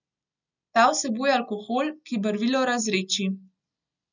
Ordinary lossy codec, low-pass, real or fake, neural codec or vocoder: none; 7.2 kHz; real; none